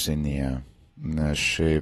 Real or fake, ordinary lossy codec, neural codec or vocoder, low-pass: real; AAC, 32 kbps; none; 19.8 kHz